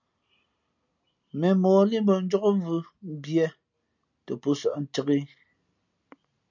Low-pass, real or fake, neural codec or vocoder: 7.2 kHz; real; none